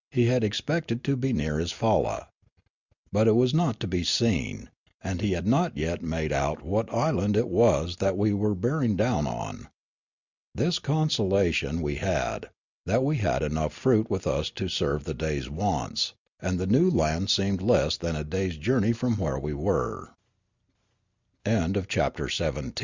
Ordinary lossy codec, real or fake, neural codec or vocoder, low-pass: Opus, 64 kbps; real; none; 7.2 kHz